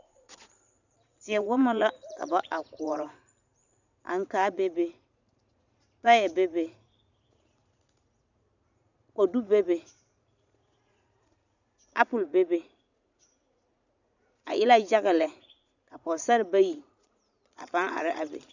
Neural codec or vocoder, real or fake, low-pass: vocoder, 44.1 kHz, 80 mel bands, Vocos; fake; 7.2 kHz